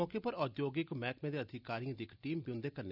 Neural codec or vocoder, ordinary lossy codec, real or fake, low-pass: none; none; real; 5.4 kHz